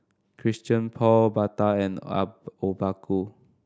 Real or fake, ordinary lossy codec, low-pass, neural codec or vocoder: real; none; none; none